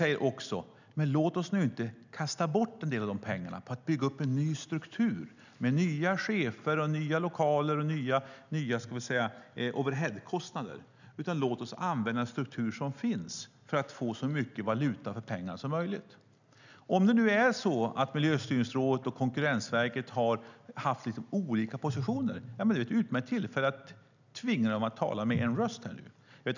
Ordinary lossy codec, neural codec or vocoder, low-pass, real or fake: none; none; 7.2 kHz; real